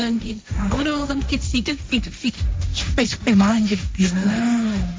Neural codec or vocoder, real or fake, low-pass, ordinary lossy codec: codec, 16 kHz, 1.1 kbps, Voila-Tokenizer; fake; none; none